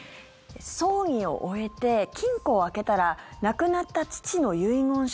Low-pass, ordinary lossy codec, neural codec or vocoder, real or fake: none; none; none; real